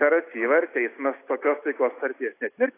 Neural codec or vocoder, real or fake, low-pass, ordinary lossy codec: none; real; 3.6 kHz; AAC, 24 kbps